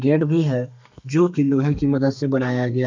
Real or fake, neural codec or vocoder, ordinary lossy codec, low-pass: fake; codec, 32 kHz, 1.9 kbps, SNAC; MP3, 64 kbps; 7.2 kHz